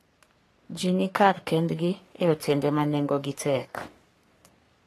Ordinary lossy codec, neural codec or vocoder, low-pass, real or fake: AAC, 48 kbps; codec, 44.1 kHz, 3.4 kbps, Pupu-Codec; 14.4 kHz; fake